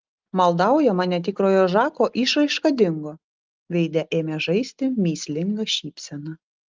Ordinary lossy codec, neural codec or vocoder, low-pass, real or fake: Opus, 32 kbps; none; 7.2 kHz; real